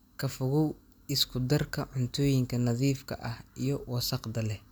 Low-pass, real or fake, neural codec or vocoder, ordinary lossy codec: none; real; none; none